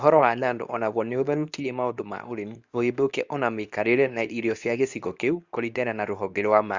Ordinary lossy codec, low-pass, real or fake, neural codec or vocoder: Opus, 64 kbps; 7.2 kHz; fake; codec, 24 kHz, 0.9 kbps, WavTokenizer, medium speech release version 2